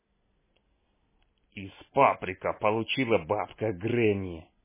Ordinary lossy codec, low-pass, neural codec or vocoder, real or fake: MP3, 16 kbps; 3.6 kHz; none; real